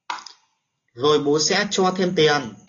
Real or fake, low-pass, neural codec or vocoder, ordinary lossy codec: real; 7.2 kHz; none; AAC, 32 kbps